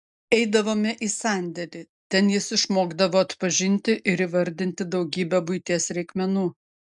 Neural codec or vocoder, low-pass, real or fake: none; 10.8 kHz; real